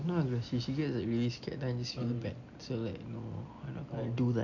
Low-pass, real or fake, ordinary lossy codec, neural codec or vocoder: 7.2 kHz; real; none; none